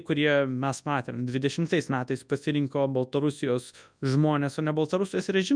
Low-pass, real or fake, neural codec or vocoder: 9.9 kHz; fake; codec, 24 kHz, 0.9 kbps, WavTokenizer, large speech release